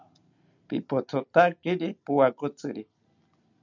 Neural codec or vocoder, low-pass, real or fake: none; 7.2 kHz; real